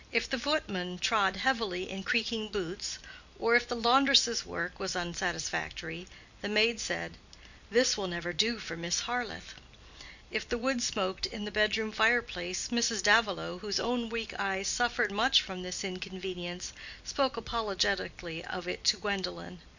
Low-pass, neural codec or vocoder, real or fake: 7.2 kHz; none; real